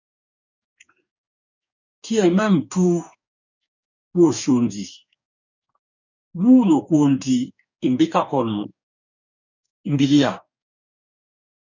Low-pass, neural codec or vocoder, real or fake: 7.2 kHz; codec, 44.1 kHz, 2.6 kbps, DAC; fake